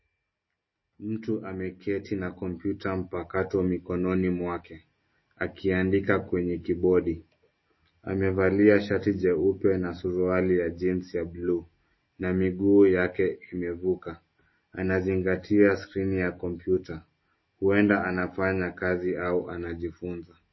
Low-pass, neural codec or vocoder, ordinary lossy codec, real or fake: 7.2 kHz; none; MP3, 24 kbps; real